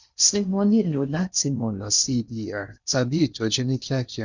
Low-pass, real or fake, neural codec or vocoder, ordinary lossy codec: 7.2 kHz; fake; codec, 16 kHz in and 24 kHz out, 0.6 kbps, FocalCodec, streaming, 4096 codes; none